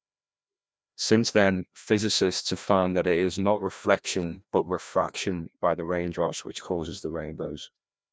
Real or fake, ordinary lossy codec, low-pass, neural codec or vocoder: fake; none; none; codec, 16 kHz, 1 kbps, FreqCodec, larger model